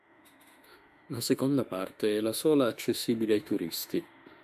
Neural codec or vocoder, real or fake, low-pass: autoencoder, 48 kHz, 32 numbers a frame, DAC-VAE, trained on Japanese speech; fake; 14.4 kHz